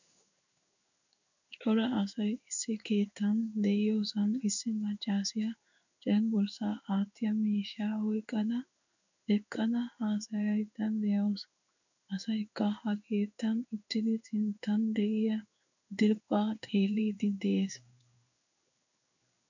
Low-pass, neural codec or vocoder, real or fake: 7.2 kHz; codec, 16 kHz in and 24 kHz out, 1 kbps, XY-Tokenizer; fake